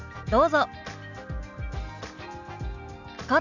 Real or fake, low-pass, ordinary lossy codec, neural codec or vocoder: real; 7.2 kHz; none; none